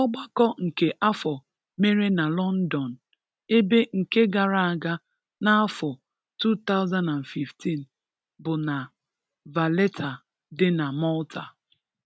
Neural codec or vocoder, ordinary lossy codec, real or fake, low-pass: none; none; real; none